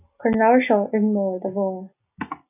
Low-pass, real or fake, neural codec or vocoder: 3.6 kHz; real; none